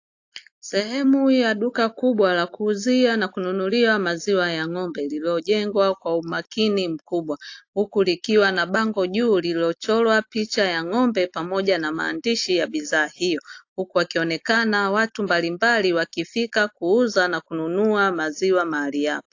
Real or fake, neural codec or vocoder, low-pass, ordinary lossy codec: real; none; 7.2 kHz; AAC, 48 kbps